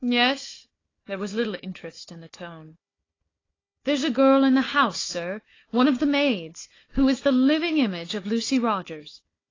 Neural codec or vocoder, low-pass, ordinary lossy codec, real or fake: codec, 16 kHz, 4 kbps, FunCodec, trained on Chinese and English, 50 frames a second; 7.2 kHz; AAC, 32 kbps; fake